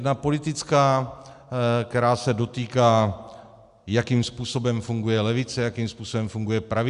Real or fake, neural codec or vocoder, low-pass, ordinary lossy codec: real; none; 10.8 kHz; AAC, 96 kbps